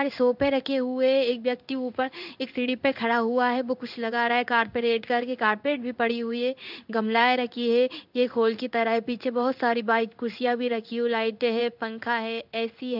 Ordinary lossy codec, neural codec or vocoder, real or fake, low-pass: none; codec, 16 kHz in and 24 kHz out, 1 kbps, XY-Tokenizer; fake; 5.4 kHz